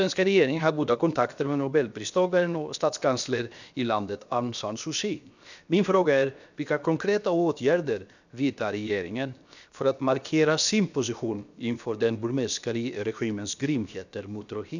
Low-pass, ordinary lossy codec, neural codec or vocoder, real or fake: 7.2 kHz; none; codec, 16 kHz, 0.7 kbps, FocalCodec; fake